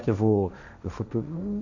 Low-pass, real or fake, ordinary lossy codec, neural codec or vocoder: 7.2 kHz; fake; none; codec, 16 kHz, 1.1 kbps, Voila-Tokenizer